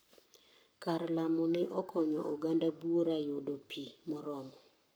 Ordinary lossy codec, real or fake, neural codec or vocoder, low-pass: none; fake; codec, 44.1 kHz, 7.8 kbps, Pupu-Codec; none